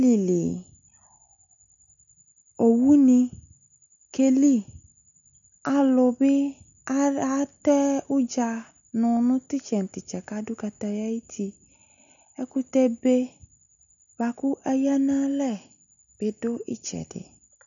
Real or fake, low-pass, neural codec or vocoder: real; 7.2 kHz; none